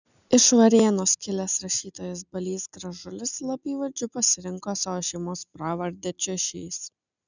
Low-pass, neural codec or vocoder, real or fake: 7.2 kHz; none; real